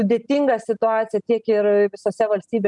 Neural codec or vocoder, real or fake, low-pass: none; real; 10.8 kHz